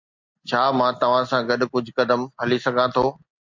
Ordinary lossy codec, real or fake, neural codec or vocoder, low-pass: MP3, 64 kbps; real; none; 7.2 kHz